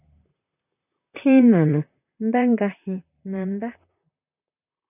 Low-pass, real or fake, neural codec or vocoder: 3.6 kHz; fake; vocoder, 44.1 kHz, 128 mel bands, Pupu-Vocoder